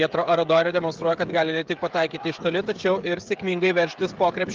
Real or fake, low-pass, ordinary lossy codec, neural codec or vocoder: fake; 7.2 kHz; Opus, 32 kbps; codec, 16 kHz, 16 kbps, FreqCodec, smaller model